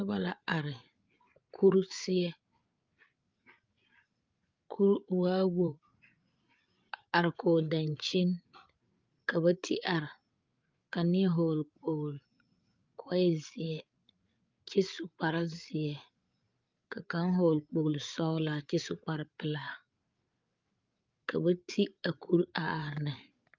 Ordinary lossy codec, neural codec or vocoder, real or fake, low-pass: Opus, 24 kbps; codec, 16 kHz, 8 kbps, FreqCodec, larger model; fake; 7.2 kHz